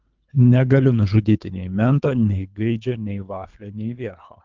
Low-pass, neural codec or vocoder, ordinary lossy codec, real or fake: 7.2 kHz; codec, 24 kHz, 3 kbps, HILCodec; Opus, 32 kbps; fake